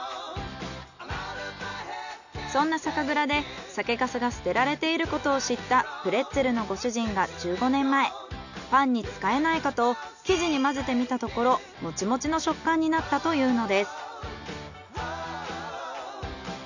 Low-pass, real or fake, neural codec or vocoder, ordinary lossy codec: 7.2 kHz; real; none; none